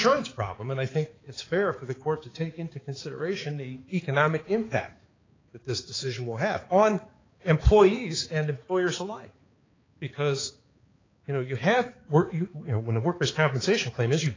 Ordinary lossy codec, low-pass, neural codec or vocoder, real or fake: AAC, 32 kbps; 7.2 kHz; codec, 16 kHz, 4 kbps, X-Codec, HuBERT features, trained on balanced general audio; fake